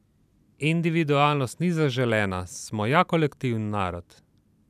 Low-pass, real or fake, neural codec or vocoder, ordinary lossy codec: 14.4 kHz; fake; vocoder, 44.1 kHz, 128 mel bands every 512 samples, BigVGAN v2; none